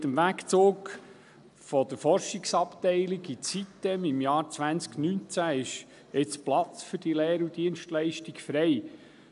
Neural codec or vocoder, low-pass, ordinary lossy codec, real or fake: none; 10.8 kHz; none; real